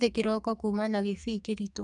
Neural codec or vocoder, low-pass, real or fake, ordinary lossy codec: codec, 44.1 kHz, 2.6 kbps, SNAC; 10.8 kHz; fake; none